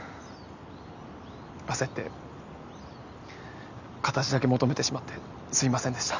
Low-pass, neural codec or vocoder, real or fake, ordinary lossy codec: 7.2 kHz; none; real; none